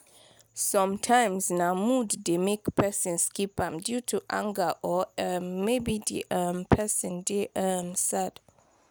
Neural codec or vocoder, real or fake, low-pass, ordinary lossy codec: none; real; none; none